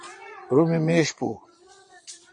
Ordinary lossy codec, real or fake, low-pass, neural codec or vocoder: MP3, 48 kbps; fake; 9.9 kHz; vocoder, 22.05 kHz, 80 mel bands, Vocos